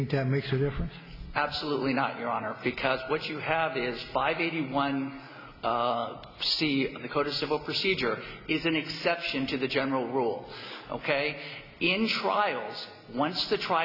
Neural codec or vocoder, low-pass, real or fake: none; 5.4 kHz; real